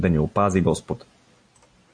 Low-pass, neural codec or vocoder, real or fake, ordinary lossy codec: 9.9 kHz; none; real; AAC, 64 kbps